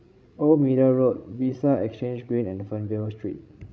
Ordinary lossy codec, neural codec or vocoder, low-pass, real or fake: none; codec, 16 kHz, 16 kbps, FreqCodec, larger model; none; fake